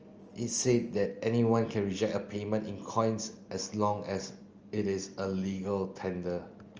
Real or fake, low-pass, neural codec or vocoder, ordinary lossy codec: real; 7.2 kHz; none; Opus, 24 kbps